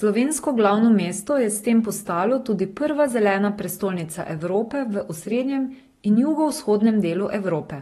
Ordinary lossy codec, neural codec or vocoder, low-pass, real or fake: AAC, 32 kbps; none; 19.8 kHz; real